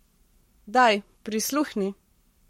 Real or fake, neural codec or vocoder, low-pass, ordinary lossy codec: fake; codec, 44.1 kHz, 7.8 kbps, Pupu-Codec; 19.8 kHz; MP3, 64 kbps